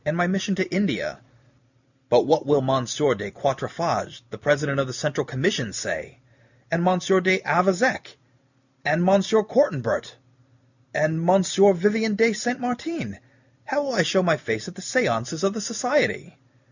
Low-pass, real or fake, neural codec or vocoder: 7.2 kHz; real; none